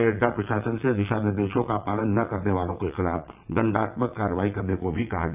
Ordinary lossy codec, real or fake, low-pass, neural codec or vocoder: AAC, 32 kbps; fake; 3.6 kHz; vocoder, 22.05 kHz, 80 mel bands, WaveNeXt